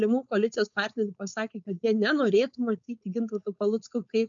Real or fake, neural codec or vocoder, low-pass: fake; codec, 16 kHz, 4.8 kbps, FACodec; 7.2 kHz